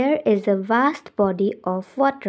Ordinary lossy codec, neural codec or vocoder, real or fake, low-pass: none; none; real; none